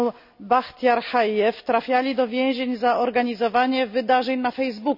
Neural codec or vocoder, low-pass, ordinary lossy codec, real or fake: none; 5.4 kHz; none; real